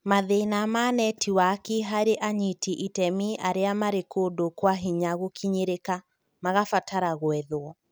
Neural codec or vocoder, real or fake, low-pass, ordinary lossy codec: none; real; none; none